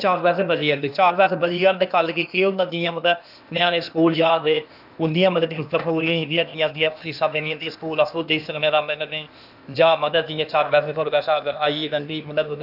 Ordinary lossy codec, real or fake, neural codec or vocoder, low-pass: none; fake; codec, 16 kHz, 0.8 kbps, ZipCodec; 5.4 kHz